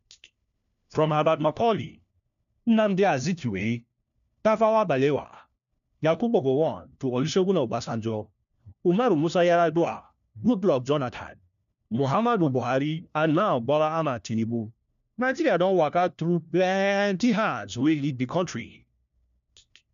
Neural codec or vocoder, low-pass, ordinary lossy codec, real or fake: codec, 16 kHz, 1 kbps, FunCodec, trained on LibriTTS, 50 frames a second; 7.2 kHz; none; fake